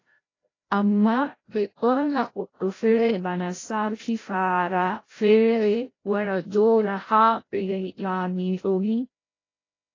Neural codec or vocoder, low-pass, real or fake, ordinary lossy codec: codec, 16 kHz, 0.5 kbps, FreqCodec, larger model; 7.2 kHz; fake; AAC, 32 kbps